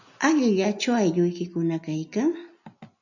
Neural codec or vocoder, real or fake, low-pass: none; real; 7.2 kHz